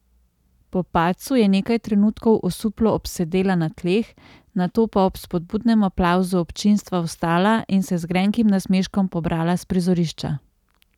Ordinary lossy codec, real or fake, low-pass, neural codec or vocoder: none; real; 19.8 kHz; none